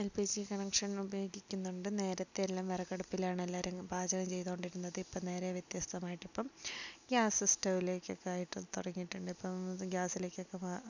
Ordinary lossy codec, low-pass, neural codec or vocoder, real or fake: none; 7.2 kHz; none; real